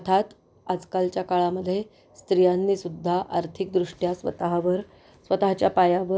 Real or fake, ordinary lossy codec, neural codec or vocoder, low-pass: real; none; none; none